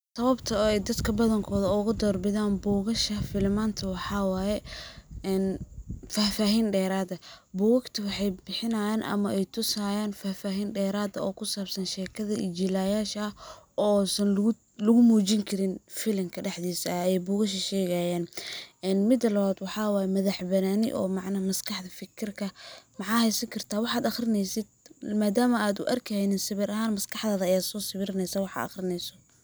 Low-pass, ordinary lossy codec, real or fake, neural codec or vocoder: none; none; real; none